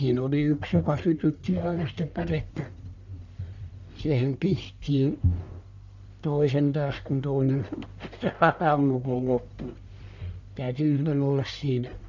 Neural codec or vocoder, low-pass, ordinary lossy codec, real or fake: codec, 44.1 kHz, 1.7 kbps, Pupu-Codec; 7.2 kHz; none; fake